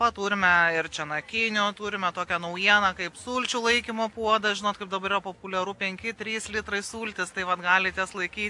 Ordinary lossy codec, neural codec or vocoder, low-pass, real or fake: AAC, 64 kbps; none; 10.8 kHz; real